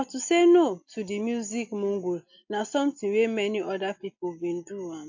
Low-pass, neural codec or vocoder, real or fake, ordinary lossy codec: 7.2 kHz; none; real; AAC, 48 kbps